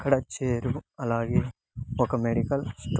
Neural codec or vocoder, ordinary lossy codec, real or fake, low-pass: none; none; real; none